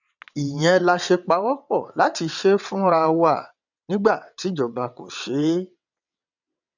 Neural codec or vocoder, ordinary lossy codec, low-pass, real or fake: vocoder, 22.05 kHz, 80 mel bands, WaveNeXt; none; 7.2 kHz; fake